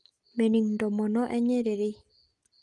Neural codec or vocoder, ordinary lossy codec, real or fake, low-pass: none; Opus, 24 kbps; real; 10.8 kHz